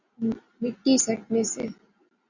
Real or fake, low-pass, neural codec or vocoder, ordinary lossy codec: real; 7.2 kHz; none; Opus, 64 kbps